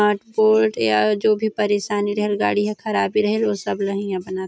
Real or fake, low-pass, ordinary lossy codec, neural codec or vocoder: real; none; none; none